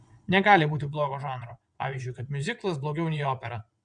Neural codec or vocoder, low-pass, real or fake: vocoder, 22.05 kHz, 80 mel bands, WaveNeXt; 9.9 kHz; fake